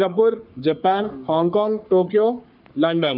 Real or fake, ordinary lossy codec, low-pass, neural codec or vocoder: fake; none; 5.4 kHz; codec, 44.1 kHz, 3.4 kbps, Pupu-Codec